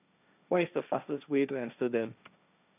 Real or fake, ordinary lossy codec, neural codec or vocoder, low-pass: fake; none; codec, 16 kHz, 1.1 kbps, Voila-Tokenizer; 3.6 kHz